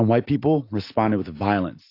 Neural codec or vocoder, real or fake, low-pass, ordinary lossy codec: none; real; 5.4 kHz; AAC, 32 kbps